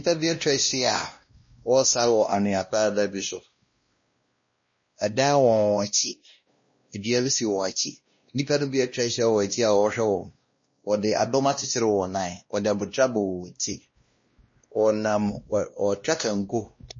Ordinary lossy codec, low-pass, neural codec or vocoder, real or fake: MP3, 32 kbps; 7.2 kHz; codec, 16 kHz, 1 kbps, X-Codec, WavLM features, trained on Multilingual LibriSpeech; fake